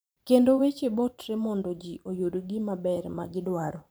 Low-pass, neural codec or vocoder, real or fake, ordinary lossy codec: none; vocoder, 44.1 kHz, 128 mel bands every 512 samples, BigVGAN v2; fake; none